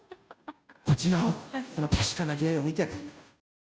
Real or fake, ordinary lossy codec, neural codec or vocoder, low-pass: fake; none; codec, 16 kHz, 0.5 kbps, FunCodec, trained on Chinese and English, 25 frames a second; none